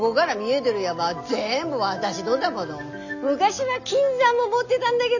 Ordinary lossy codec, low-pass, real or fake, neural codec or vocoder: none; 7.2 kHz; real; none